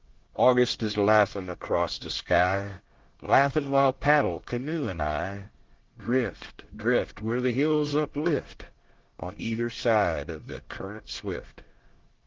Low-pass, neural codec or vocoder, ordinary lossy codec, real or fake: 7.2 kHz; codec, 24 kHz, 1 kbps, SNAC; Opus, 16 kbps; fake